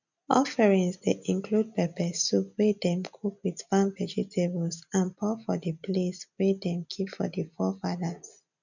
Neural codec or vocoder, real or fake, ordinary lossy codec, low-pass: none; real; none; 7.2 kHz